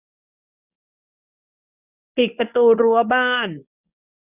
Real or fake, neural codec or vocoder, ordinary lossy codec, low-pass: fake; codec, 24 kHz, 0.9 kbps, WavTokenizer, medium speech release version 1; none; 3.6 kHz